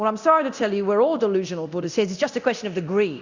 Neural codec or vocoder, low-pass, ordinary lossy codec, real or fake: codec, 24 kHz, 0.9 kbps, DualCodec; 7.2 kHz; Opus, 64 kbps; fake